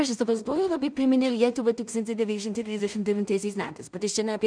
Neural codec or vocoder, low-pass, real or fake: codec, 16 kHz in and 24 kHz out, 0.4 kbps, LongCat-Audio-Codec, two codebook decoder; 9.9 kHz; fake